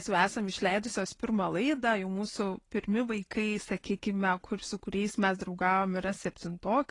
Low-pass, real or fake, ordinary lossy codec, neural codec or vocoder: 10.8 kHz; real; AAC, 32 kbps; none